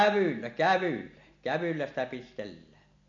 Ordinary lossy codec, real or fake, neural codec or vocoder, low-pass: MP3, 64 kbps; real; none; 7.2 kHz